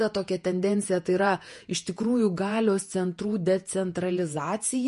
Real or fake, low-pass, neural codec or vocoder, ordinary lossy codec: fake; 14.4 kHz; vocoder, 48 kHz, 128 mel bands, Vocos; MP3, 48 kbps